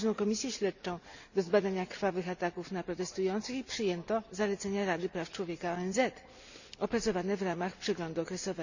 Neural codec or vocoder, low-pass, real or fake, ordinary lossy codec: none; 7.2 kHz; real; none